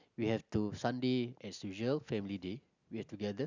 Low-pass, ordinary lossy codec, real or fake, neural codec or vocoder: 7.2 kHz; none; real; none